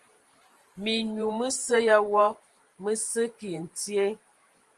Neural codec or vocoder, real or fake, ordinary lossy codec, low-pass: vocoder, 44.1 kHz, 128 mel bands every 512 samples, BigVGAN v2; fake; Opus, 24 kbps; 10.8 kHz